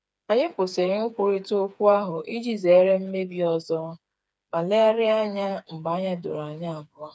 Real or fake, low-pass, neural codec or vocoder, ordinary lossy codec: fake; none; codec, 16 kHz, 4 kbps, FreqCodec, smaller model; none